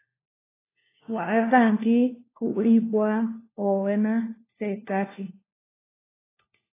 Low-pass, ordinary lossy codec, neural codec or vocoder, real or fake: 3.6 kHz; AAC, 16 kbps; codec, 16 kHz, 1 kbps, FunCodec, trained on LibriTTS, 50 frames a second; fake